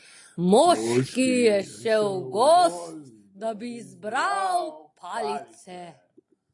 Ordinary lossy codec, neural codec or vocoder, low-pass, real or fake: AAC, 48 kbps; none; 10.8 kHz; real